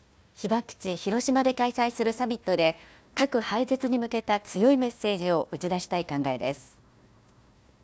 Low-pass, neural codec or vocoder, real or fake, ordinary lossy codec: none; codec, 16 kHz, 1 kbps, FunCodec, trained on Chinese and English, 50 frames a second; fake; none